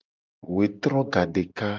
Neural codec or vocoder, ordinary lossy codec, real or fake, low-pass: none; Opus, 24 kbps; real; 7.2 kHz